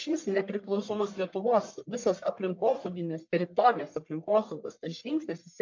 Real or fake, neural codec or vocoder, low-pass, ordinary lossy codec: fake; codec, 44.1 kHz, 1.7 kbps, Pupu-Codec; 7.2 kHz; MP3, 64 kbps